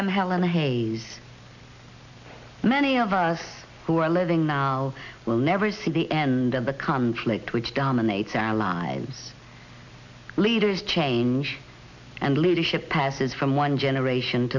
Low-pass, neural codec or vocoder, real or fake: 7.2 kHz; none; real